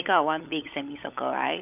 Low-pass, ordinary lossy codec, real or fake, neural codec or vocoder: 3.6 kHz; none; fake; codec, 16 kHz, 16 kbps, FunCodec, trained on LibriTTS, 50 frames a second